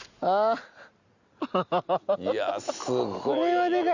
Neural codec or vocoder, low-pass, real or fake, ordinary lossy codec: none; 7.2 kHz; real; Opus, 64 kbps